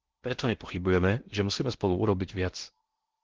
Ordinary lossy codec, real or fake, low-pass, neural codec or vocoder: Opus, 16 kbps; fake; 7.2 kHz; codec, 16 kHz in and 24 kHz out, 0.8 kbps, FocalCodec, streaming, 65536 codes